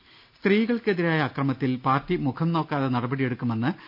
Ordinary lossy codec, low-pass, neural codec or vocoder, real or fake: none; 5.4 kHz; none; real